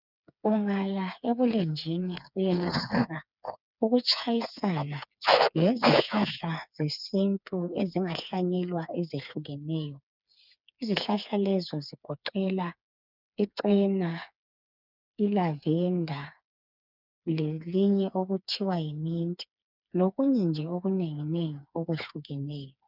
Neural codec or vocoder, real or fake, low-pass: codec, 16 kHz, 4 kbps, FreqCodec, smaller model; fake; 5.4 kHz